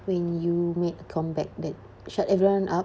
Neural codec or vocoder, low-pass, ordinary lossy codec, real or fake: none; none; none; real